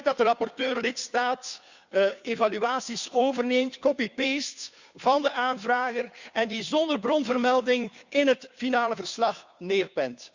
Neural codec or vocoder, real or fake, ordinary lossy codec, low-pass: codec, 16 kHz, 2 kbps, FunCodec, trained on Chinese and English, 25 frames a second; fake; Opus, 64 kbps; 7.2 kHz